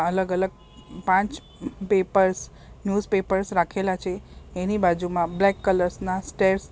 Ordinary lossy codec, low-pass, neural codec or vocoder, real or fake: none; none; none; real